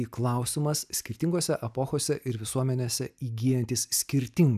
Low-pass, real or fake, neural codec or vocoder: 14.4 kHz; real; none